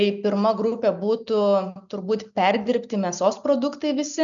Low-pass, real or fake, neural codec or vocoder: 7.2 kHz; real; none